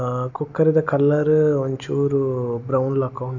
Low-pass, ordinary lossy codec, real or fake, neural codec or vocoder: 7.2 kHz; none; real; none